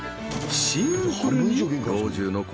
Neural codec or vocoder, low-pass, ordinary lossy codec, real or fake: none; none; none; real